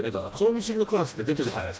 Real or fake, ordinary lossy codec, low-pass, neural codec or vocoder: fake; none; none; codec, 16 kHz, 1 kbps, FreqCodec, smaller model